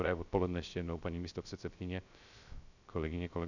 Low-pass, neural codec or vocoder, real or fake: 7.2 kHz; codec, 16 kHz, 0.3 kbps, FocalCodec; fake